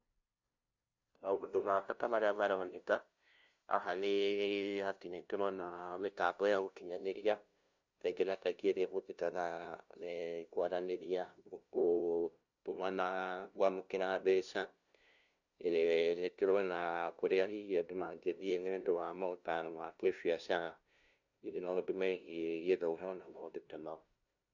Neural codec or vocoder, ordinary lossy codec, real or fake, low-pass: codec, 16 kHz, 0.5 kbps, FunCodec, trained on LibriTTS, 25 frames a second; none; fake; 7.2 kHz